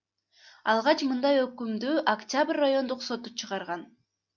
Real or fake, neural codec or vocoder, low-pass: real; none; 7.2 kHz